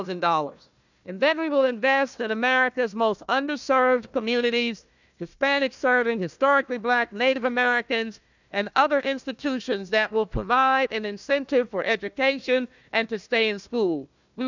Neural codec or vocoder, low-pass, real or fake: codec, 16 kHz, 1 kbps, FunCodec, trained on Chinese and English, 50 frames a second; 7.2 kHz; fake